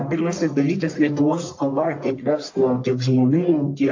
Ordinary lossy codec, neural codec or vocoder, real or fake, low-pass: AAC, 48 kbps; codec, 44.1 kHz, 1.7 kbps, Pupu-Codec; fake; 7.2 kHz